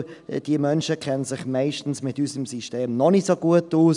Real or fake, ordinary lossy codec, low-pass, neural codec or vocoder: real; none; 10.8 kHz; none